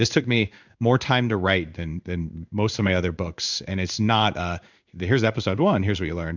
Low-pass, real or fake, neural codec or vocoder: 7.2 kHz; fake; codec, 16 kHz in and 24 kHz out, 1 kbps, XY-Tokenizer